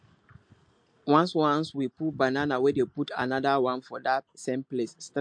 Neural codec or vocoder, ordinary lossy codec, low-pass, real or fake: none; MP3, 48 kbps; 9.9 kHz; real